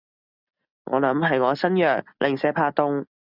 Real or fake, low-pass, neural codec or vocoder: real; 5.4 kHz; none